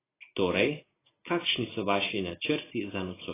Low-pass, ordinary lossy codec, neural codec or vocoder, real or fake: 3.6 kHz; AAC, 16 kbps; none; real